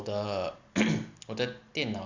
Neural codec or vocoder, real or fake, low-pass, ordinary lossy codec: none; real; 7.2 kHz; Opus, 64 kbps